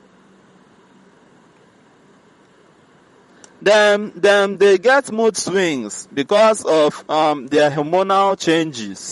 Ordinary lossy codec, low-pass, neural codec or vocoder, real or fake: MP3, 48 kbps; 19.8 kHz; vocoder, 44.1 kHz, 128 mel bands, Pupu-Vocoder; fake